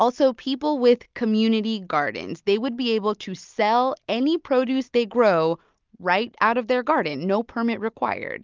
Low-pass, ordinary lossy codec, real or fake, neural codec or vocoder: 7.2 kHz; Opus, 24 kbps; real; none